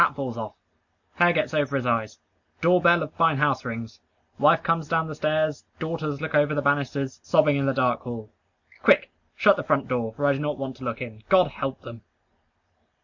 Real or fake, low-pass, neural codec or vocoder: real; 7.2 kHz; none